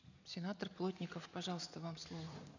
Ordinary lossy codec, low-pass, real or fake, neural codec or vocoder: none; 7.2 kHz; real; none